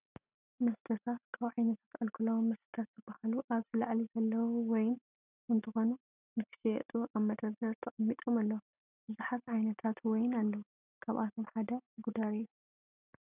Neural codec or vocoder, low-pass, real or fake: none; 3.6 kHz; real